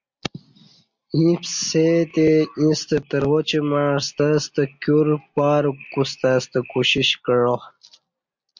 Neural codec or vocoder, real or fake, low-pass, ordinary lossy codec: none; real; 7.2 kHz; MP3, 64 kbps